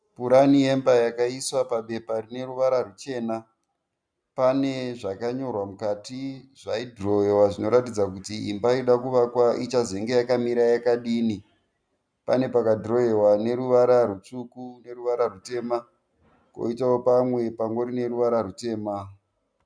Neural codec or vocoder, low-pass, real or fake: none; 9.9 kHz; real